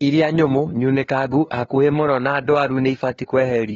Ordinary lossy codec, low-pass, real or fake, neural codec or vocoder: AAC, 24 kbps; 7.2 kHz; fake; codec, 16 kHz, 2 kbps, FunCodec, trained on LibriTTS, 25 frames a second